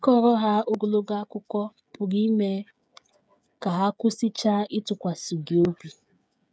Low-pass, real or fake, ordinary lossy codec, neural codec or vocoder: none; fake; none; codec, 16 kHz, 16 kbps, FreqCodec, smaller model